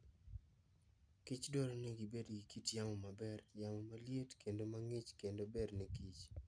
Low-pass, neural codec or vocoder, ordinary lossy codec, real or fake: 9.9 kHz; none; none; real